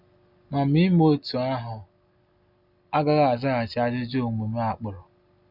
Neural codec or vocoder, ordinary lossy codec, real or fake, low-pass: none; none; real; 5.4 kHz